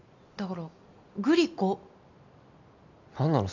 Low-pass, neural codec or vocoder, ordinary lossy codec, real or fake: 7.2 kHz; none; none; real